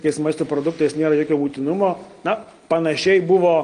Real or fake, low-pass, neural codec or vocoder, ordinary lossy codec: real; 9.9 kHz; none; Opus, 24 kbps